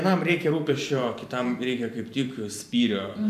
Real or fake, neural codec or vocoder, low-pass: fake; vocoder, 44.1 kHz, 128 mel bands every 512 samples, BigVGAN v2; 14.4 kHz